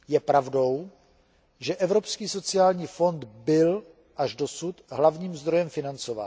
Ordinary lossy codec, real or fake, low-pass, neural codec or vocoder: none; real; none; none